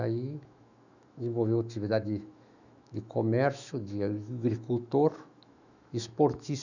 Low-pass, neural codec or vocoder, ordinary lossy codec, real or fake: 7.2 kHz; autoencoder, 48 kHz, 128 numbers a frame, DAC-VAE, trained on Japanese speech; none; fake